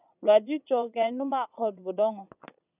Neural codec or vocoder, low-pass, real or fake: vocoder, 22.05 kHz, 80 mel bands, Vocos; 3.6 kHz; fake